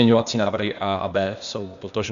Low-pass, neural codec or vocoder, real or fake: 7.2 kHz; codec, 16 kHz, 0.8 kbps, ZipCodec; fake